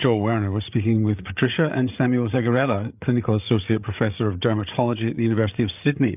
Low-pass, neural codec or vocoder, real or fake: 3.6 kHz; codec, 16 kHz, 8 kbps, FreqCodec, larger model; fake